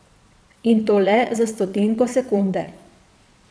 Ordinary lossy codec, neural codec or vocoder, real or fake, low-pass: none; vocoder, 22.05 kHz, 80 mel bands, Vocos; fake; none